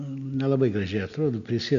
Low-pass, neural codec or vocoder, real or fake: 7.2 kHz; none; real